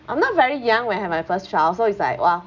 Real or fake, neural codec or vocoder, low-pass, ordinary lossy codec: real; none; 7.2 kHz; none